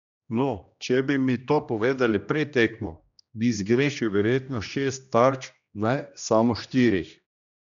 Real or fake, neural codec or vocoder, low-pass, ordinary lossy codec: fake; codec, 16 kHz, 1 kbps, X-Codec, HuBERT features, trained on general audio; 7.2 kHz; none